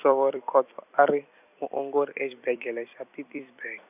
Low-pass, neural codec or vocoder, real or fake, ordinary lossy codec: 3.6 kHz; vocoder, 44.1 kHz, 128 mel bands every 256 samples, BigVGAN v2; fake; none